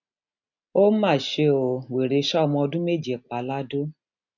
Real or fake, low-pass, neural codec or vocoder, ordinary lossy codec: real; 7.2 kHz; none; none